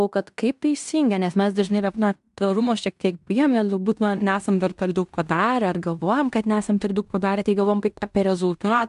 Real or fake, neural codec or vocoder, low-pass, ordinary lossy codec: fake; codec, 16 kHz in and 24 kHz out, 0.9 kbps, LongCat-Audio-Codec, fine tuned four codebook decoder; 10.8 kHz; AAC, 96 kbps